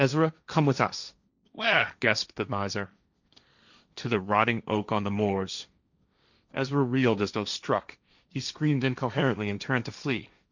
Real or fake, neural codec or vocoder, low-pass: fake; codec, 16 kHz, 1.1 kbps, Voila-Tokenizer; 7.2 kHz